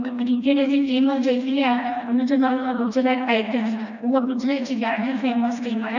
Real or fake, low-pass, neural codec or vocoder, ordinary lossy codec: fake; 7.2 kHz; codec, 16 kHz, 1 kbps, FreqCodec, smaller model; none